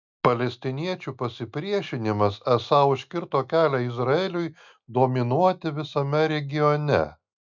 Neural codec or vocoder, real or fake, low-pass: none; real; 7.2 kHz